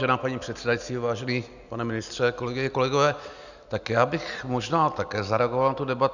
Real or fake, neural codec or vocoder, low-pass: real; none; 7.2 kHz